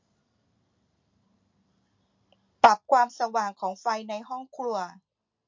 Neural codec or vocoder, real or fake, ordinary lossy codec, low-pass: none; real; MP3, 48 kbps; 7.2 kHz